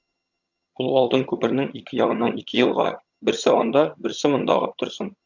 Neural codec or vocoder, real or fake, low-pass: vocoder, 22.05 kHz, 80 mel bands, HiFi-GAN; fake; 7.2 kHz